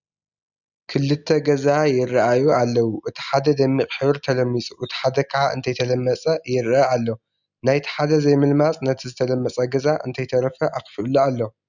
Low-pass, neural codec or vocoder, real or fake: 7.2 kHz; none; real